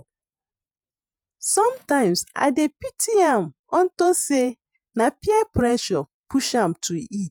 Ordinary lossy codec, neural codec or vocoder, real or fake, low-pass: none; vocoder, 48 kHz, 128 mel bands, Vocos; fake; none